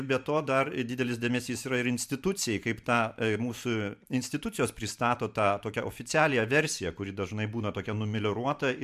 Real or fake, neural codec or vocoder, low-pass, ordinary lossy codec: fake; vocoder, 44.1 kHz, 128 mel bands every 512 samples, BigVGAN v2; 14.4 kHz; MP3, 96 kbps